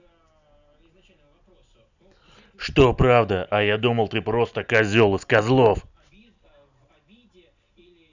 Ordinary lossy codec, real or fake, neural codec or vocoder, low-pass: none; real; none; 7.2 kHz